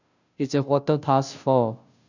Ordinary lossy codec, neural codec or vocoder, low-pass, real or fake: none; codec, 16 kHz, 0.5 kbps, FunCodec, trained on Chinese and English, 25 frames a second; 7.2 kHz; fake